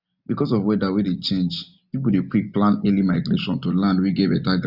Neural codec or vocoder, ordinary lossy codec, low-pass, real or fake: none; none; 5.4 kHz; real